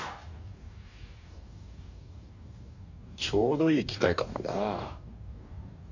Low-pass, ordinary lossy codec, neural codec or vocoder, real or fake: 7.2 kHz; none; codec, 44.1 kHz, 2.6 kbps, DAC; fake